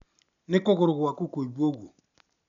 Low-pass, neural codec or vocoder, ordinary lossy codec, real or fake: 7.2 kHz; none; none; real